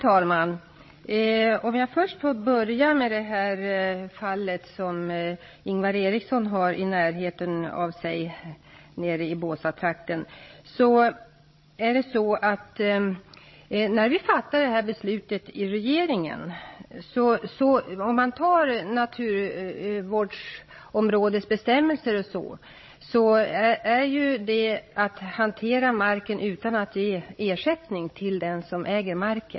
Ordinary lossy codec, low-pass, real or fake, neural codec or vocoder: MP3, 24 kbps; 7.2 kHz; fake; codec, 16 kHz, 16 kbps, FreqCodec, larger model